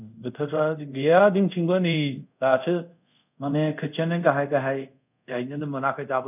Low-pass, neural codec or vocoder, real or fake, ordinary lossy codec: 3.6 kHz; codec, 24 kHz, 0.5 kbps, DualCodec; fake; none